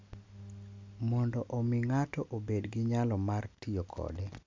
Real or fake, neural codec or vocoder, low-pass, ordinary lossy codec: real; none; 7.2 kHz; MP3, 64 kbps